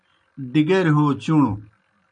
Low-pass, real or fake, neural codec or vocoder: 9.9 kHz; real; none